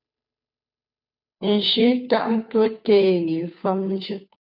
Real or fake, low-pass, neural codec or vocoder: fake; 5.4 kHz; codec, 16 kHz, 2 kbps, FunCodec, trained on Chinese and English, 25 frames a second